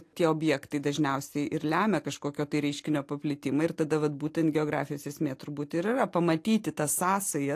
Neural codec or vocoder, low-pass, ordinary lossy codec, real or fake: none; 14.4 kHz; AAC, 64 kbps; real